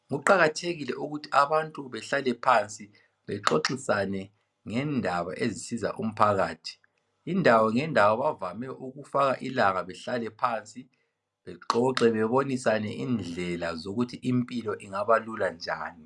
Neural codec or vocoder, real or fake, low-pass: none; real; 10.8 kHz